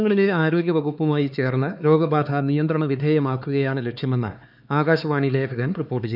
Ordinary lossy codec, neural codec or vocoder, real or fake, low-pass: none; codec, 16 kHz, 4 kbps, X-Codec, HuBERT features, trained on LibriSpeech; fake; 5.4 kHz